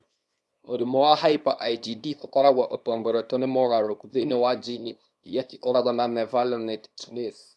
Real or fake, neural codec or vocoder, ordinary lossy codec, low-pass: fake; codec, 24 kHz, 0.9 kbps, WavTokenizer, small release; none; none